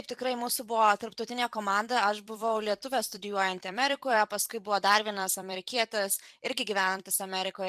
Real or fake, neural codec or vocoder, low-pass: fake; vocoder, 44.1 kHz, 128 mel bands every 256 samples, BigVGAN v2; 14.4 kHz